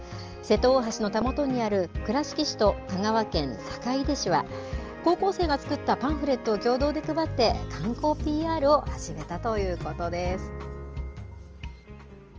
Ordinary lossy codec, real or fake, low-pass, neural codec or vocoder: Opus, 24 kbps; real; 7.2 kHz; none